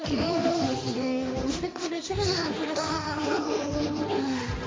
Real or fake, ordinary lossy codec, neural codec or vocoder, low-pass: fake; none; codec, 16 kHz, 1.1 kbps, Voila-Tokenizer; none